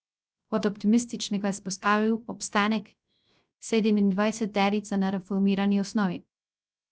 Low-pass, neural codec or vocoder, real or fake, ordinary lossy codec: none; codec, 16 kHz, 0.3 kbps, FocalCodec; fake; none